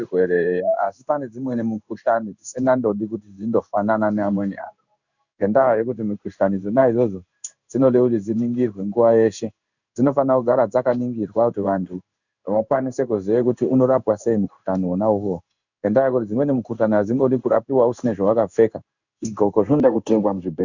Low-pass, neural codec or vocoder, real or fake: 7.2 kHz; codec, 16 kHz in and 24 kHz out, 1 kbps, XY-Tokenizer; fake